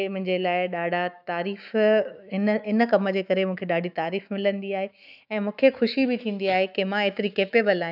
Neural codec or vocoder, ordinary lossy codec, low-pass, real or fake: autoencoder, 48 kHz, 128 numbers a frame, DAC-VAE, trained on Japanese speech; none; 5.4 kHz; fake